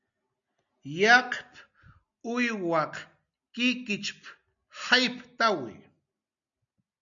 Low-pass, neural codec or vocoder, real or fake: 7.2 kHz; none; real